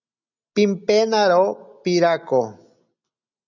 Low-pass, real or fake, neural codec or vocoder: 7.2 kHz; real; none